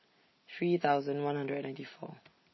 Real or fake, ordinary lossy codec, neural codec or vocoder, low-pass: real; MP3, 24 kbps; none; 7.2 kHz